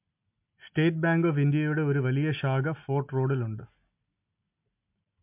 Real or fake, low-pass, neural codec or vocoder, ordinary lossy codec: real; 3.6 kHz; none; MP3, 32 kbps